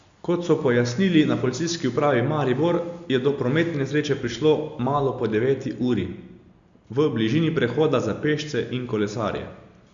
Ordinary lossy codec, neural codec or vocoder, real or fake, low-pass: Opus, 64 kbps; none; real; 7.2 kHz